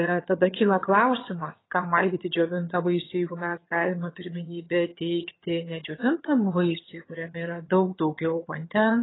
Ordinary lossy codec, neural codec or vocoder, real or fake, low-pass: AAC, 16 kbps; vocoder, 22.05 kHz, 80 mel bands, HiFi-GAN; fake; 7.2 kHz